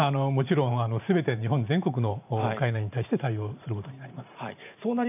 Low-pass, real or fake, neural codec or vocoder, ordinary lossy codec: 3.6 kHz; fake; vocoder, 44.1 kHz, 128 mel bands every 512 samples, BigVGAN v2; none